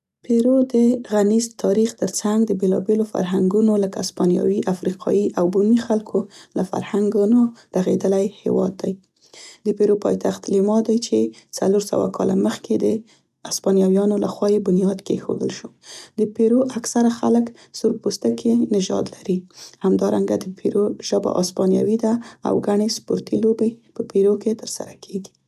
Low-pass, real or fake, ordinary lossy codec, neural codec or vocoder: 14.4 kHz; real; none; none